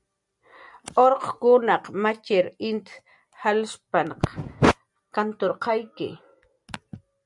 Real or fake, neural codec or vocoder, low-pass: real; none; 10.8 kHz